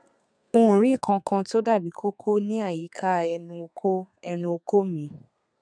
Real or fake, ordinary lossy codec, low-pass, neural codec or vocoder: fake; none; 9.9 kHz; codec, 32 kHz, 1.9 kbps, SNAC